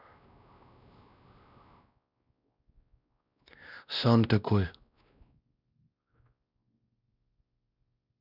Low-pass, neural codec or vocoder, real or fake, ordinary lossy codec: 5.4 kHz; codec, 16 kHz, 1 kbps, X-Codec, WavLM features, trained on Multilingual LibriSpeech; fake; none